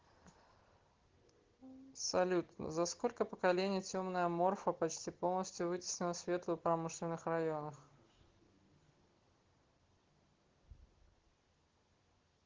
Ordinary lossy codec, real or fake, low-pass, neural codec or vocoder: Opus, 16 kbps; real; 7.2 kHz; none